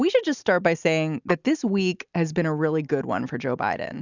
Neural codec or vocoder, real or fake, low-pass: none; real; 7.2 kHz